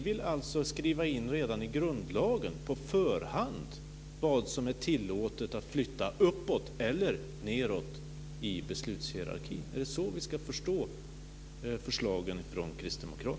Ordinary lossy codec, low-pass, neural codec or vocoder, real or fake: none; none; none; real